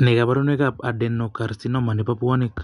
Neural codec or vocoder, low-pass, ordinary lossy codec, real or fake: none; 10.8 kHz; none; real